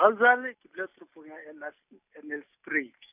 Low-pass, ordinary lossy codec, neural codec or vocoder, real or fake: 3.6 kHz; none; none; real